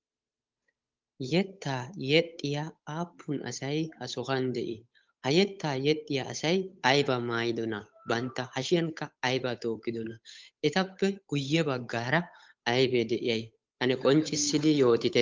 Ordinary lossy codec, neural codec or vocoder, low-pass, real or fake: Opus, 24 kbps; codec, 16 kHz, 8 kbps, FunCodec, trained on Chinese and English, 25 frames a second; 7.2 kHz; fake